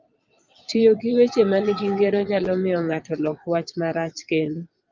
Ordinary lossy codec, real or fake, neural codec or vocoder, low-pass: Opus, 24 kbps; fake; vocoder, 22.05 kHz, 80 mel bands, Vocos; 7.2 kHz